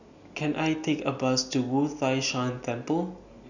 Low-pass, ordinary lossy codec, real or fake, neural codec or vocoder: 7.2 kHz; none; real; none